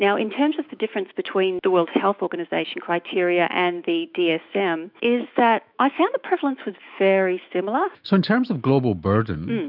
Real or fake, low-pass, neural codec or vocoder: fake; 5.4 kHz; autoencoder, 48 kHz, 128 numbers a frame, DAC-VAE, trained on Japanese speech